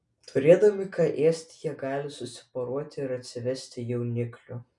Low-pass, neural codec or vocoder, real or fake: 10.8 kHz; none; real